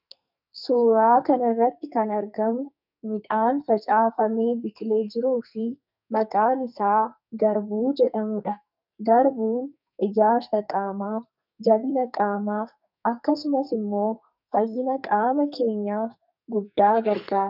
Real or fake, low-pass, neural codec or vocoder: fake; 5.4 kHz; codec, 44.1 kHz, 2.6 kbps, SNAC